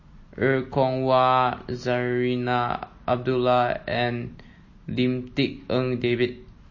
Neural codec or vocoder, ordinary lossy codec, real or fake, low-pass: none; MP3, 32 kbps; real; 7.2 kHz